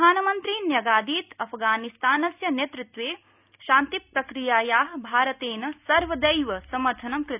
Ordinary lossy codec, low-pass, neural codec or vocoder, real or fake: none; 3.6 kHz; none; real